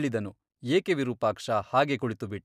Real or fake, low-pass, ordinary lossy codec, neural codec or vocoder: real; 14.4 kHz; none; none